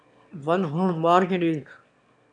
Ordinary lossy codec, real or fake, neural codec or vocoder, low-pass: MP3, 96 kbps; fake; autoencoder, 22.05 kHz, a latent of 192 numbers a frame, VITS, trained on one speaker; 9.9 kHz